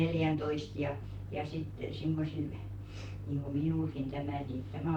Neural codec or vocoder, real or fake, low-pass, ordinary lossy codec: vocoder, 44.1 kHz, 128 mel bands, Pupu-Vocoder; fake; 19.8 kHz; none